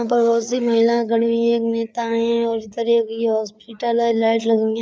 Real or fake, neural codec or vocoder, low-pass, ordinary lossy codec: fake; codec, 16 kHz, 4 kbps, FreqCodec, larger model; none; none